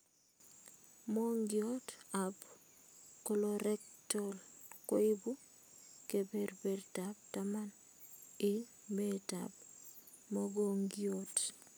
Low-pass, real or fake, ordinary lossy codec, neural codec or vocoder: none; real; none; none